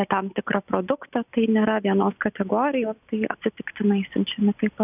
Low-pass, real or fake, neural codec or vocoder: 3.6 kHz; real; none